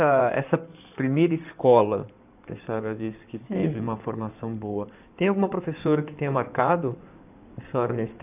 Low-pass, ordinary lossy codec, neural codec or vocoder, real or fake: 3.6 kHz; none; codec, 16 kHz in and 24 kHz out, 2.2 kbps, FireRedTTS-2 codec; fake